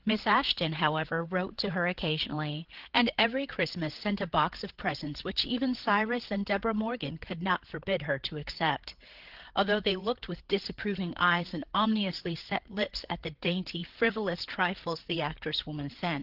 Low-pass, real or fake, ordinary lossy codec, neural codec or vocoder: 5.4 kHz; fake; Opus, 16 kbps; codec, 16 kHz, 8 kbps, FreqCodec, larger model